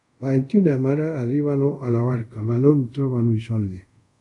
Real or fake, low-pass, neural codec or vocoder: fake; 10.8 kHz; codec, 24 kHz, 0.5 kbps, DualCodec